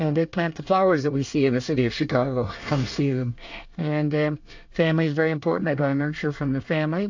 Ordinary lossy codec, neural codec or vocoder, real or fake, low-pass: AAC, 48 kbps; codec, 24 kHz, 1 kbps, SNAC; fake; 7.2 kHz